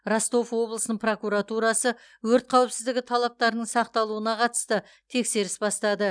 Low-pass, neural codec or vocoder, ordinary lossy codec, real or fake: 9.9 kHz; none; none; real